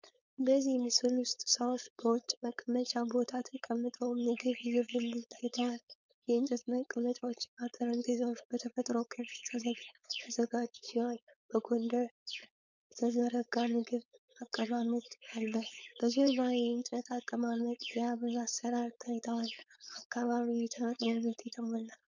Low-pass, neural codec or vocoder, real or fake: 7.2 kHz; codec, 16 kHz, 4.8 kbps, FACodec; fake